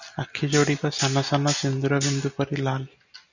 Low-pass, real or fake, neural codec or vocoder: 7.2 kHz; real; none